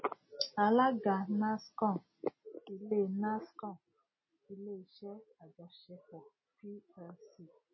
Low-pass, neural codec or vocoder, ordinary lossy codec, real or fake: 7.2 kHz; none; MP3, 24 kbps; real